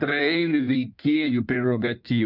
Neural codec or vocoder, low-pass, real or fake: codec, 16 kHz in and 24 kHz out, 1.1 kbps, FireRedTTS-2 codec; 5.4 kHz; fake